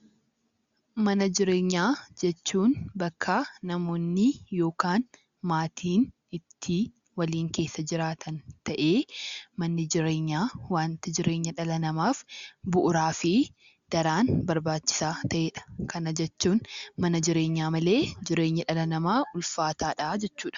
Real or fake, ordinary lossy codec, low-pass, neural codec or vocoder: real; Opus, 64 kbps; 7.2 kHz; none